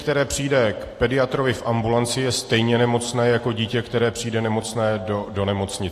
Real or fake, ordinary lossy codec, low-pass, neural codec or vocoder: real; AAC, 48 kbps; 14.4 kHz; none